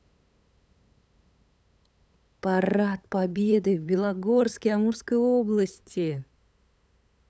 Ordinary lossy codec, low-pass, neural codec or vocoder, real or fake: none; none; codec, 16 kHz, 8 kbps, FunCodec, trained on LibriTTS, 25 frames a second; fake